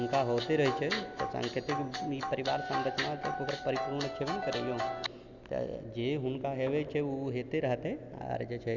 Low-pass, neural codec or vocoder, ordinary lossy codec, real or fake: 7.2 kHz; none; none; real